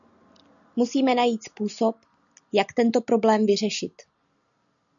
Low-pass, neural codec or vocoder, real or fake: 7.2 kHz; none; real